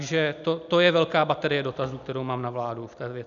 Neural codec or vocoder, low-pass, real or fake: none; 7.2 kHz; real